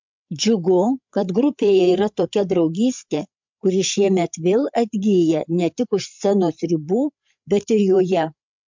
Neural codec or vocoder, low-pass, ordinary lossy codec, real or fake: codec, 16 kHz, 8 kbps, FreqCodec, larger model; 7.2 kHz; MP3, 64 kbps; fake